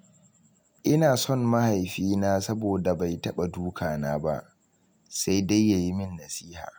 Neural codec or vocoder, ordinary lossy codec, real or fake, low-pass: none; none; real; none